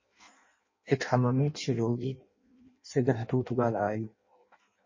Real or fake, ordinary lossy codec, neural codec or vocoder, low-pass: fake; MP3, 32 kbps; codec, 16 kHz in and 24 kHz out, 0.6 kbps, FireRedTTS-2 codec; 7.2 kHz